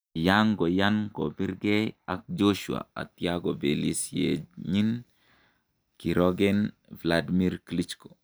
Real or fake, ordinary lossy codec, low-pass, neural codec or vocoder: real; none; none; none